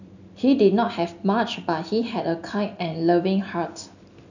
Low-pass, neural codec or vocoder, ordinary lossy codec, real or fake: 7.2 kHz; none; none; real